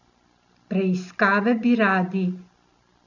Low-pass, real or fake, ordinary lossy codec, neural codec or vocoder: 7.2 kHz; real; none; none